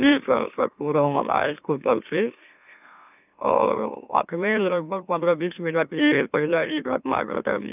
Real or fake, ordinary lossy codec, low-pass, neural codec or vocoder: fake; none; 3.6 kHz; autoencoder, 44.1 kHz, a latent of 192 numbers a frame, MeloTTS